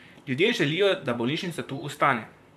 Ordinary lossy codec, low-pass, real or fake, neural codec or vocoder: MP3, 96 kbps; 14.4 kHz; fake; vocoder, 44.1 kHz, 128 mel bands, Pupu-Vocoder